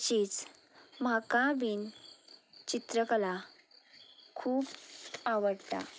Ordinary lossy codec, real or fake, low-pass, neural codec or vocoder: none; real; none; none